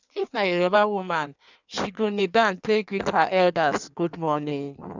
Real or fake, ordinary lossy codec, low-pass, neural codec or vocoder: fake; none; 7.2 kHz; codec, 16 kHz in and 24 kHz out, 1.1 kbps, FireRedTTS-2 codec